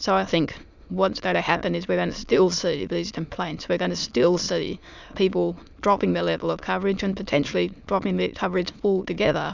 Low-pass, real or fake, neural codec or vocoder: 7.2 kHz; fake; autoencoder, 22.05 kHz, a latent of 192 numbers a frame, VITS, trained on many speakers